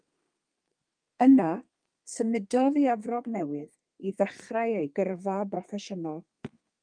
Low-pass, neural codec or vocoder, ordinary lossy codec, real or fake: 9.9 kHz; codec, 32 kHz, 1.9 kbps, SNAC; Opus, 32 kbps; fake